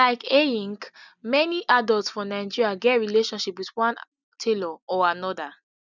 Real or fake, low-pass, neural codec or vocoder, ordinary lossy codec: real; 7.2 kHz; none; none